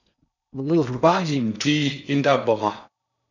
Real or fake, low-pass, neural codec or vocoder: fake; 7.2 kHz; codec, 16 kHz in and 24 kHz out, 0.6 kbps, FocalCodec, streaming, 4096 codes